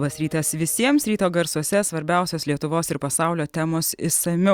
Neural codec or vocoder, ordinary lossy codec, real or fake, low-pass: none; Opus, 64 kbps; real; 19.8 kHz